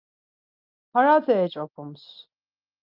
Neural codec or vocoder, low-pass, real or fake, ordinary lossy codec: none; 5.4 kHz; real; Opus, 24 kbps